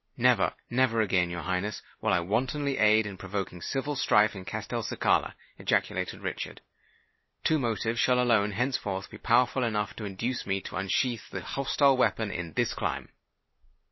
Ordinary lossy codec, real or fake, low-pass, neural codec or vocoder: MP3, 24 kbps; real; 7.2 kHz; none